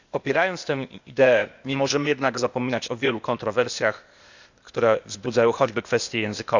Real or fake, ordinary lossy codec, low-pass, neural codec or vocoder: fake; Opus, 64 kbps; 7.2 kHz; codec, 16 kHz, 0.8 kbps, ZipCodec